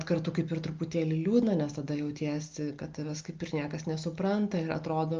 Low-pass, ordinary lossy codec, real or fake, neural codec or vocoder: 7.2 kHz; Opus, 24 kbps; real; none